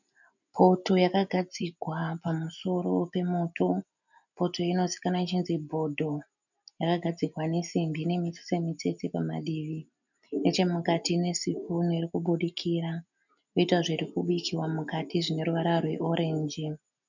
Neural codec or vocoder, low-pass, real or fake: none; 7.2 kHz; real